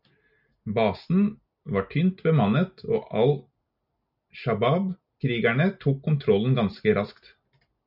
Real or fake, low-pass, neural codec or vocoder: real; 5.4 kHz; none